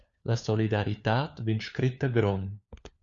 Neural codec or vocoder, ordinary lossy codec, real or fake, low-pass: codec, 16 kHz, 4 kbps, FunCodec, trained on LibriTTS, 50 frames a second; Opus, 64 kbps; fake; 7.2 kHz